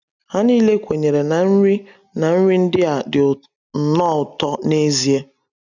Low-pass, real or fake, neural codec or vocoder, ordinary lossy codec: 7.2 kHz; real; none; none